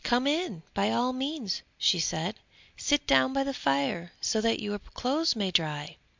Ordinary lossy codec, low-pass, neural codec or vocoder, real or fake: MP3, 64 kbps; 7.2 kHz; none; real